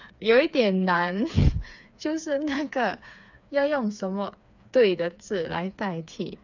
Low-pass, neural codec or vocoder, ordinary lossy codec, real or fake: 7.2 kHz; codec, 16 kHz, 4 kbps, FreqCodec, smaller model; none; fake